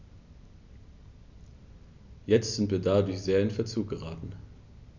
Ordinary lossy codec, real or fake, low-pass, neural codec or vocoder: none; real; 7.2 kHz; none